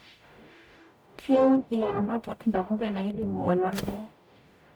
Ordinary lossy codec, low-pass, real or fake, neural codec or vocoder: none; none; fake; codec, 44.1 kHz, 0.9 kbps, DAC